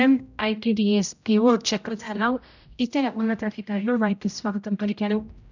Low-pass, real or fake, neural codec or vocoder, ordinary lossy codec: 7.2 kHz; fake; codec, 16 kHz, 0.5 kbps, X-Codec, HuBERT features, trained on general audio; none